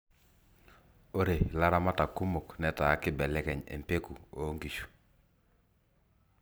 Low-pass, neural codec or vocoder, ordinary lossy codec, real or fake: none; vocoder, 44.1 kHz, 128 mel bands every 256 samples, BigVGAN v2; none; fake